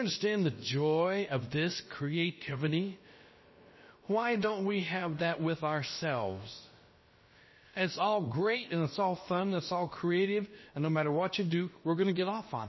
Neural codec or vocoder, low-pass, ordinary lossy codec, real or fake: codec, 16 kHz, about 1 kbps, DyCAST, with the encoder's durations; 7.2 kHz; MP3, 24 kbps; fake